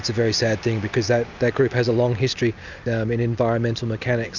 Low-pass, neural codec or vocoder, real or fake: 7.2 kHz; none; real